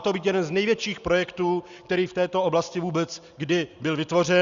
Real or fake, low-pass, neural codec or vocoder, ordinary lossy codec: real; 7.2 kHz; none; Opus, 64 kbps